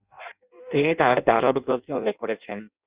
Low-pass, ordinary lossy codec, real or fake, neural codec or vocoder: 3.6 kHz; Opus, 64 kbps; fake; codec, 16 kHz in and 24 kHz out, 0.6 kbps, FireRedTTS-2 codec